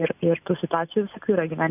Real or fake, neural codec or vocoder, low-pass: real; none; 3.6 kHz